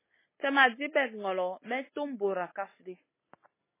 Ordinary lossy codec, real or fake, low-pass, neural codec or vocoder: MP3, 16 kbps; real; 3.6 kHz; none